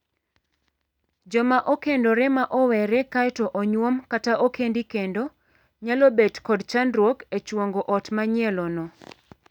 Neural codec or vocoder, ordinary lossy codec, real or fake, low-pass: none; none; real; 19.8 kHz